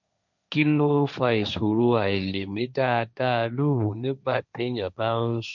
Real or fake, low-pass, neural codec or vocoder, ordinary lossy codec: fake; 7.2 kHz; codec, 16 kHz, 1.1 kbps, Voila-Tokenizer; none